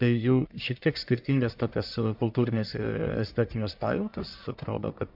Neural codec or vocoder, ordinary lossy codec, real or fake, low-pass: codec, 44.1 kHz, 1.7 kbps, Pupu-Codec; MP3, 48 kbps; fake; 5.4 kHz